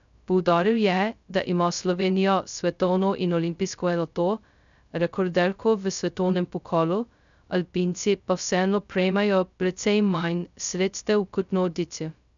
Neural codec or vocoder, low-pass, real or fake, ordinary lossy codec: codec, 16 kHz, 0.2 kbps, FocalCodec; 7.2 kHz; fake; none